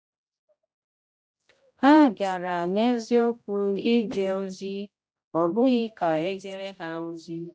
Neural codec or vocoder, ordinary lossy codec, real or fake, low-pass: codec, 16 kHz, 0.5 kbps, X-Codec, HuBERT features, trained on general audio; none; fake; none